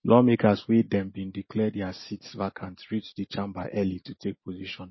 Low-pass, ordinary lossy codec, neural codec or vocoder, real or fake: 7.2 kHz; MP3, 24 kbps; none; real